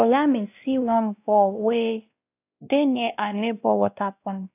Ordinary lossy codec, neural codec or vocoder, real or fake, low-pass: AAC, 32 kbps; codec, 16 kHz, 1 kbps, X-Codec, WavLM features, trained on Multilingual LibriSpeech; fake; 3.6 kHz